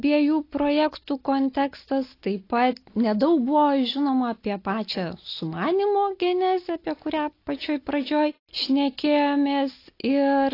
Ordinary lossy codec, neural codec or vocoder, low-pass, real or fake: AAC, 32 kbps; none; 5.4 kHz; real